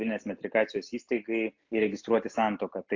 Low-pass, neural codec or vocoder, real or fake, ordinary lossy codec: 7.2 kHz; none; real; AAC, 48 kbps